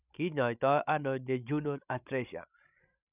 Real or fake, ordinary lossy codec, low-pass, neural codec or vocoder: fake; none; 3.6 kHz; codec, 16 kHz, 16 kbps, FreqCodec, larger model